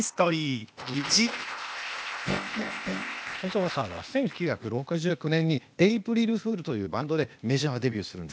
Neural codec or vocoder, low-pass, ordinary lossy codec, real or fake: codec, 16 kHz, 0.8 kbps, ZipCodec; none; none; fake